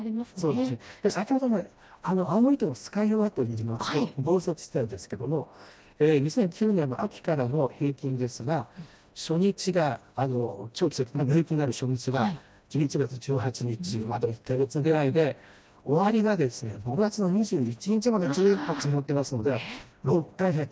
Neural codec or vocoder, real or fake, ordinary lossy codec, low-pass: codec, 16 kHz, 1 kbps, FreqCodec, smaller model; fake; none; none